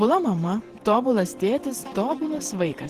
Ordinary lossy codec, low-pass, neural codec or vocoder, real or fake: Opus, 16 kbps; 14.4 kHz; vocoder, 48 kHz, 128 mel bands, Vocos; fake